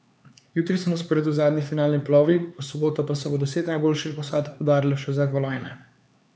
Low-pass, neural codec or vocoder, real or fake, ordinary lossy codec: none; codec, 16 kHz, 4 kbps, X-Codec, HuBERT features, trained on LibriSpeech; fake; none